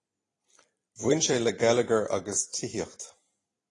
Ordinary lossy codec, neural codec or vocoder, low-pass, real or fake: AAC, 32 kbps; vocoder, 44.1 kHz, 128 mel bands every 256 samples, BigVGAN v2; 10.8 kHz; fake